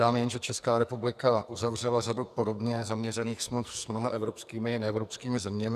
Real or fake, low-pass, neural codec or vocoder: fake; 14.4 kHz; codec, 44.1 kHz, 2.6 kbps, SNAC